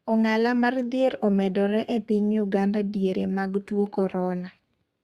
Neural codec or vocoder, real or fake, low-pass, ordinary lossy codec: codec, 32 kHz, 1.9 kbps, SNAC; fake; 14.4 kHz; Opus, 64 kbps